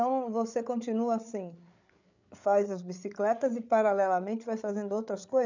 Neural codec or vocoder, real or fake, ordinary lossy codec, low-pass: codec, 16 kHz, 8 kbps, FreqCodec, larger model; fake; none; 7.2 kHz